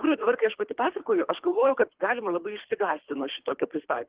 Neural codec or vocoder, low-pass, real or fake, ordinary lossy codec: codec, 24 kHz, 3 kbps, HILCodec; 3.6 kHz; fake; Opus, 16 kbps